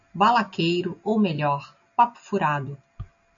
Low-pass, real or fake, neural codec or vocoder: 7.2 kHz; real; none